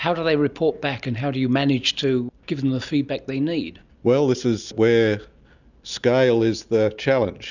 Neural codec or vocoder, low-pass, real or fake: none; 7.2 kHz; real